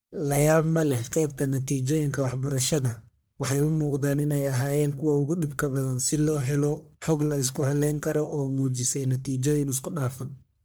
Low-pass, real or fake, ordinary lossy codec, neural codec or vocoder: none; fake; none; codec, 44.1 kHz, 1.7 kbps, Pupu-Codec